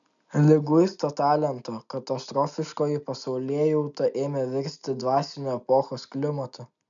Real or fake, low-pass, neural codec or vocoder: real; 7.2 kHz; none